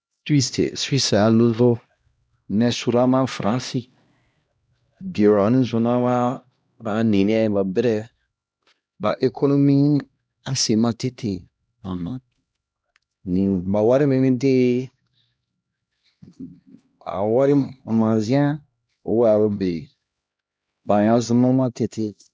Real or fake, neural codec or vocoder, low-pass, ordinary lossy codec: fake; codec, 16 kHz, 1 kbps, X-Codec, HuBERT features, trained on LibriSpeech; none; none